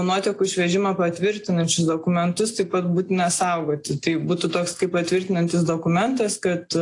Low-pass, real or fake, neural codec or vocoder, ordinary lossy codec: 10.8 kHz; real; none; AAC, 48 kbps